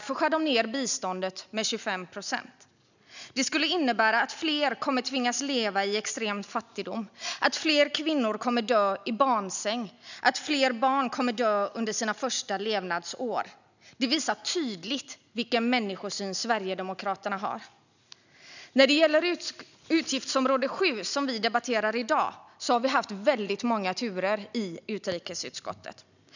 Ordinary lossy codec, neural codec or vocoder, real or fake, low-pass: none; none; real; 7.2 kHz